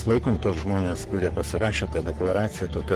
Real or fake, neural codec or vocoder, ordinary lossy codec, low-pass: fake; codec, 44.1 kHz, 3.4 kbps, Pupu-Codec; Opus, 24 kbps; 14.4 kHz